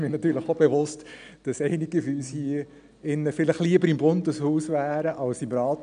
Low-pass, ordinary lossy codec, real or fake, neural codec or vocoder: 9.9 kHz; none; real; none